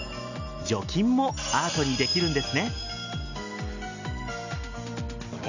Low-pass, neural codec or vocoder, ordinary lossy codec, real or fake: 7.2 kHz; none; none; real